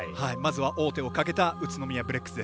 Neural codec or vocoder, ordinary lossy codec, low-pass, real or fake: none; none; none; real